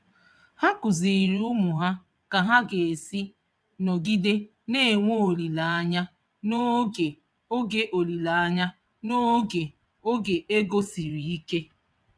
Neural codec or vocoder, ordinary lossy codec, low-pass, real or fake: vocoder, 22.05 kHz, 80 mel bands, WaveNeXt; none; none; fake